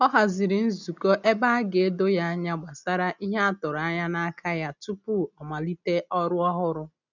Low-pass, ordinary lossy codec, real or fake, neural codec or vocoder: 7.2 kHz; none; real; none